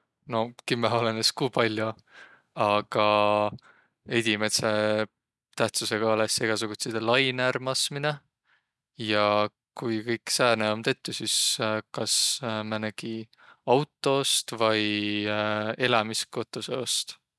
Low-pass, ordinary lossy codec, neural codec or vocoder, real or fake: none; none; none; real